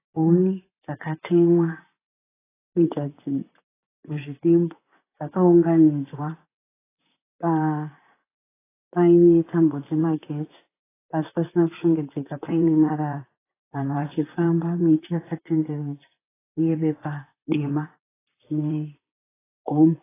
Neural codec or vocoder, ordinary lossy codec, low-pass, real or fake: vocoder, 44.1 kHz, 128 mel bands, Pupu-Vocoder; AAC, 16 kbps; 3.6 kHz; fake